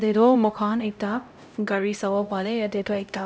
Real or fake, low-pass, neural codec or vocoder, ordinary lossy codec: fake; none; codec, 16 kHz, 0.5 kbps, X-Codec, HuBERT features, trained on LibriSpeech; none